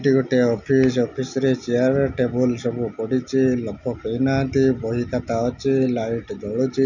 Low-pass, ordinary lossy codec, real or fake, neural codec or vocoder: 7.2 kHz; none; real; none